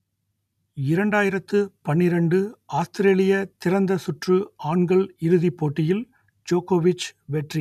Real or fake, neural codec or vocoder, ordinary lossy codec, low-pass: real; none; none; 14.4 kHz